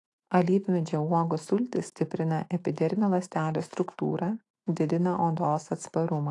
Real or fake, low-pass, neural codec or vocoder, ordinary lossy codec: fake; 10.8 kHz; autoencoder, 48 kHz, 128 numbers a frame, DAC-VAE, trained on Japanese speech; AAC, 48 kbps